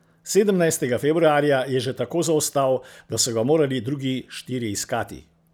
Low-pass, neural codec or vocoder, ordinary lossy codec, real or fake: none; none; none; real